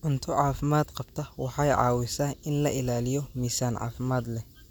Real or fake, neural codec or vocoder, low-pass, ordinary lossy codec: real; none; none; none